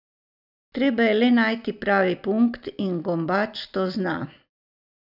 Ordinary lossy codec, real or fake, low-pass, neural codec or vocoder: none; real; 5.4 kHz; none